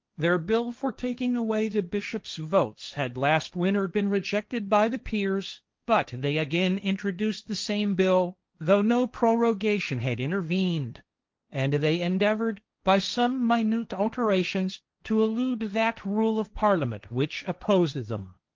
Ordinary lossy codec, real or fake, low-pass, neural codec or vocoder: Opus, 32 kbps; fake; 7.2 kHz; codec, 16 kHz, 1.1 kbps, Voila-Tokenizer